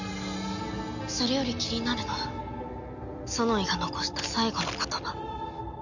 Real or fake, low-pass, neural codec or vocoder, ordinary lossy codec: real; 7.2 kHz; none; none